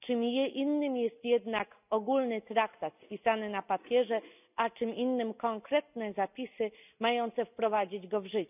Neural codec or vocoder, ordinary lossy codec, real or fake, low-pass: none; none; real; 3.6 kHz